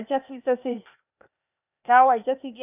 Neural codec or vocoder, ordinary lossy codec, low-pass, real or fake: codec, 16 kHz, 0.8 kbps, ZipCodec; none; 3.6 kHz; fake